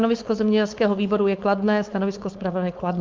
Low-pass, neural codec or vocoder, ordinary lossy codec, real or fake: 7.2 kHz; codec, 16 kHz, 4.8 kbps, FACodec; Opus, 24 kbps; fake